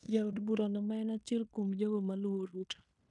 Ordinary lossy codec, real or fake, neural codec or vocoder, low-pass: none; fake; codec, 16 kHz in and 24 kHz out, 0.9 kbps, LongCat-Audio-Codec, fine tuned four codebook decoder; 10.8 kHz